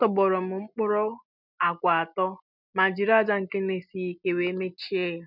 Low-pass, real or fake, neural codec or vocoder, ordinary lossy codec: 5.4 kHz; real; none; none